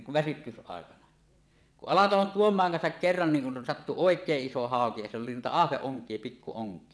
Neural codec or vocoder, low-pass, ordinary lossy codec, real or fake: vocoder, 22.05 kHz, 80 mel bands, WaveNeXt; none; none; fake